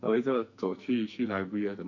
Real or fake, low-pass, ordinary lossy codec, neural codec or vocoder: fake; 7.2 kHz; none; codec, 44.1 kHz, 2.6 kbps, SNAC